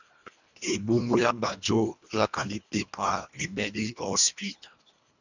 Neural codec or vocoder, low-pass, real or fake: codec, 24 kHz, 1.5 kbps, HILCodec; 7.2 kHz; fake